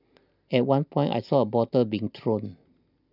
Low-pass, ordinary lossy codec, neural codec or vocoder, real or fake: 5.4 kHz; none; none; real